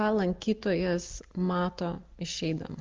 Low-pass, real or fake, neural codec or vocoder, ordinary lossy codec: 7.2 kHz; real; none; Opus, 24 kbps